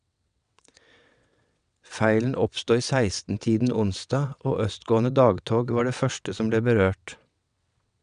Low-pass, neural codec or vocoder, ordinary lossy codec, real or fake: 9.9 kHz; vocoder, 22.05 kHz, 80 mel bands, WaveNeXt; none; fake